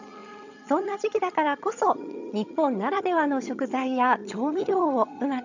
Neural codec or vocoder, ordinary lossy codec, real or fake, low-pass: vocoder, 22.05 kHz, 80 mel bands, HiFi-GAN; none; fake; 7.2 kHz